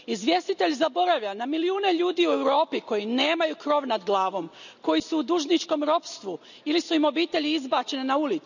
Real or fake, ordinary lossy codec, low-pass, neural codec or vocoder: real; none; 7.2 kHz; none